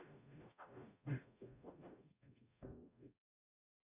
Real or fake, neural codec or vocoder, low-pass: fake; codec, 44.1 kHz, 0.9 kbps, DAC; 3.6 kHz